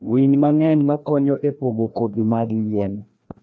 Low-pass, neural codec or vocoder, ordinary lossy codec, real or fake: none; codec, 16 kHz, 1 kbps, FreqCodec, larger model; none; fake